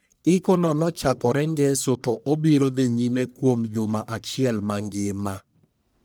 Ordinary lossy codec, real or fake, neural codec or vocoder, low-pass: none; fake; codec, 44.1 kHz, 1.7 kbps, Pupu-Codec; none